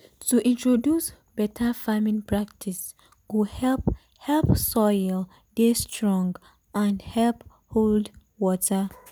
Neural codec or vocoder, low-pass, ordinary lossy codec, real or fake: none; none; none; real